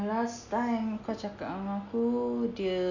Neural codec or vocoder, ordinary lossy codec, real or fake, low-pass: none; none; real; 7.2 kHz